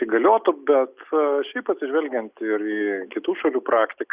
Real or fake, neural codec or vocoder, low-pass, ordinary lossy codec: real; none; 3.6 kHz; Opus, 64 kbps